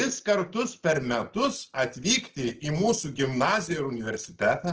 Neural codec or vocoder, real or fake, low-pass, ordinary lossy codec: none; real; 7.2 kHz; Opus, 16 kbps